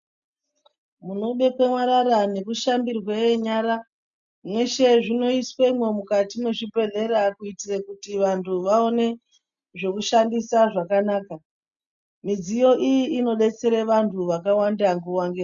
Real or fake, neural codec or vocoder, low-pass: real; none; 7.2 kHz